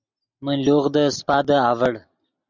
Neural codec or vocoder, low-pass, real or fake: none; 7.2 kHz; real